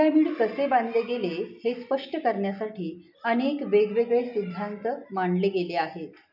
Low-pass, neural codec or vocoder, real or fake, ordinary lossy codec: 5.4 kHz; none; real; none